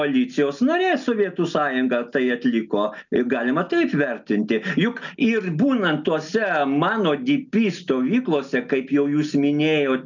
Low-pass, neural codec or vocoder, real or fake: 7.2 kHz; none; real